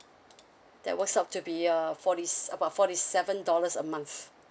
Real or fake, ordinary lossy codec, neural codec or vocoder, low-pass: real; none; none; none